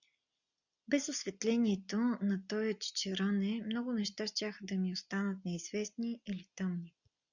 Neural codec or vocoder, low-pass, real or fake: none; 7.2 kHz; real